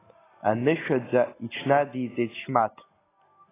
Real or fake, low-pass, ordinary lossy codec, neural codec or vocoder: real; 3.6 kHz; AAC, 16 kbps; none